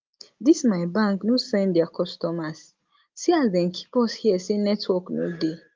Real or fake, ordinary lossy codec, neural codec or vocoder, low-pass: real; Opus, 32 kbps; none; 7.2 kHz